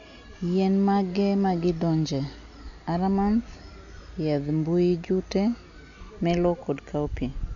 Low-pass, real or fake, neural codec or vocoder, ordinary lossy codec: 7.2 kHz; real; none; none